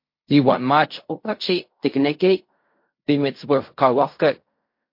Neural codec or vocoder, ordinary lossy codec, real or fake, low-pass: codec, 16 kHz in and 24 kHz out, 0.4 kbps, LongCat-Audio-Codec, fine tuned four codebook decoder; MP3, 32 kbps; fake; 5.4 kHz